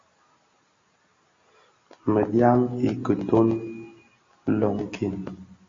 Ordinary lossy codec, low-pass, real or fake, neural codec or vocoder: AAC, 32 kbps; 7.2 kHz; real; none